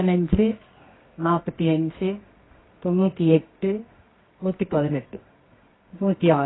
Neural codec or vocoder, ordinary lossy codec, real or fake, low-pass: codec, 24 kHz, 0.9 kbps, WavTokenizer, medium music audio release; AAC, 16 kbps; fake; 7.2 kHz